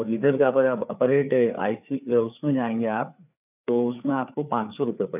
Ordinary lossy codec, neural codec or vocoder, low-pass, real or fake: none; codec, 16 kHz, 4 kbps, FreqCodec, larger model; 3.6 kHz; fake